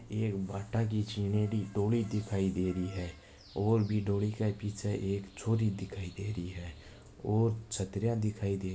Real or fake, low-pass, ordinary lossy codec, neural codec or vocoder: real; none; none; none